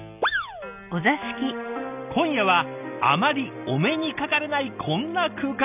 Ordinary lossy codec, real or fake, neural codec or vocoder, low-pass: none; real; none; 3.6 kHz